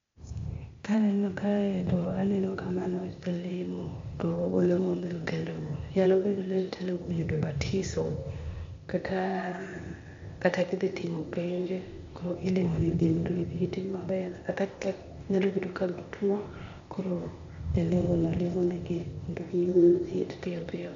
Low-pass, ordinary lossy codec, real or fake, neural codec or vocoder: 7.2 kHz; MP3, 48 kbps; fake; codec, 16 kHz, 0.8 kbps, ZipCodec